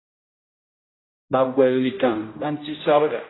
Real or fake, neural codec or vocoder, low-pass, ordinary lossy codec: fake; codec, 16 kHz, 0.5 kbps, X-Codec, HuBERT features, trained on general audio; 7.2 kHz; AAC, 16 kbps